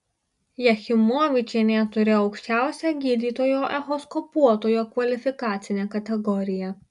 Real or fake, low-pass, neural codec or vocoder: real; 10.8 kHz; none